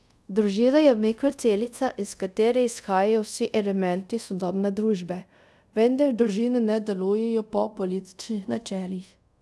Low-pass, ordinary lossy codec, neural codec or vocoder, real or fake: none; none; codec, 24 kHz, 0.5 kbps, DualCodec; fake